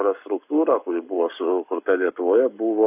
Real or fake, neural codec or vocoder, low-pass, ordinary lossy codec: fake; codec, 44.1 kHz, 7.8 kbps, Pupu-Codec; 3.6 kHz; AAC, 32 kbps